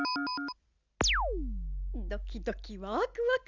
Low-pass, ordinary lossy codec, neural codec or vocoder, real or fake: 7.2 kHz; Opus, 64 kbps; none; real